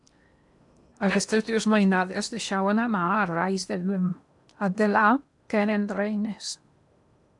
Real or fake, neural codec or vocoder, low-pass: fake; codec, 16 kHz in and 24 kHz out, 0.8 kbps, FocalCodec, streaming, 65536 codes; 10.8 kHz